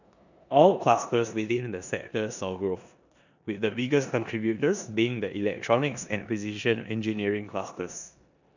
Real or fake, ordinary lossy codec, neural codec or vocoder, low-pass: fake; none; codec, 16 kHz in and 24 kHz out, 0.9 kbps, LongCat-Audio-Codec, four codebook decoder; 7.2 kHz